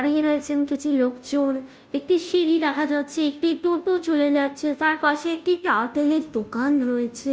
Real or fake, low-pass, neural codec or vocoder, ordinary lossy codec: fake; none; codec, 16 kHz, 0.5 kbps, FunCodec, trained on Chinese and English, 25 frames a second; none